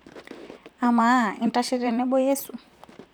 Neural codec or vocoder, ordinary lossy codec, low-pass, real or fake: vocoder, 44.1 kHz, 128 mel bands, Pupu-Vocoder; none; none; fake